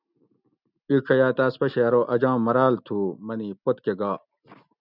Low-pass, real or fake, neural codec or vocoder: 5.4 kHz; real; none